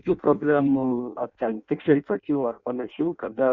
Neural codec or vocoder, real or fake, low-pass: codec, 16 kHz in and 24 kHz out, 0.6 kbps, FireRedTTS-2 codec; fake; 7.2 kHz